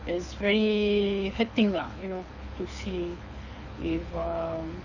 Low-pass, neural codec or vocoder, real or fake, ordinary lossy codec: 7.2 kHz; codec, 24 kHz, 6 kbps, HILCodec; fake; none